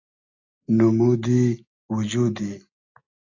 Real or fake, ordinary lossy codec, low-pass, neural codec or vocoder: real; AAC, 48 kbps; 7.2 kHz; none